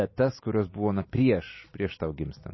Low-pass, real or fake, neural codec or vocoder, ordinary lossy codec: 7.2 kHz; real; none; MP3, 24 kbps